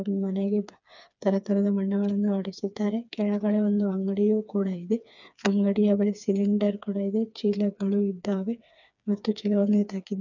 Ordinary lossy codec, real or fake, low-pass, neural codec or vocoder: none; fake; 7.2 kHz; codec, 16 kHz, 4 kbps, FreqCodec, smaller model